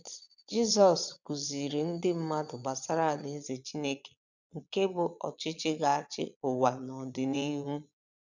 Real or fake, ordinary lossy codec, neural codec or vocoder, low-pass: fake; none; vocoder, 22.05 kHz, 80 mel bands, Vocos; 7.2 kHz